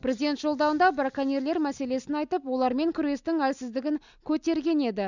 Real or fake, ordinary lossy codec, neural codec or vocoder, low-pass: real; none; none; 7.2 kHz